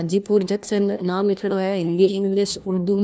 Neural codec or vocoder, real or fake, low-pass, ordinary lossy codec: codec, 16 kHz, 1 kbps, FunCodec, trained on LibriTTS, 50 frames a second; fake; none; none